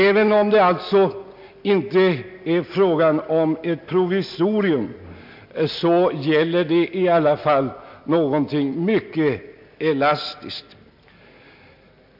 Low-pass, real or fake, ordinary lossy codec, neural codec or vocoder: 5.4 kHz; real; MP3, 48 kbps; none